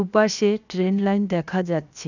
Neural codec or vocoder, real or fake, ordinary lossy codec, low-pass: codec, 16 kHz, 0.7 kbps, FocalCodec; fake; none; 7.2 kHz